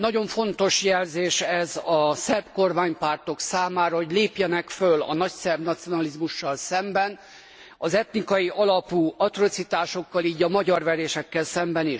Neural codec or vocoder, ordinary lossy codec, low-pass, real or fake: none; none; none; real